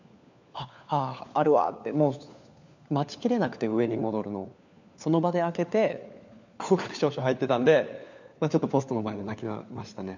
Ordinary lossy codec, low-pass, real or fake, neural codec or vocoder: none; 7.2 kHz; fake; codec, 16 kHz, 4 kbps, FunCodec, trained on LibriTTS, 50 frames a second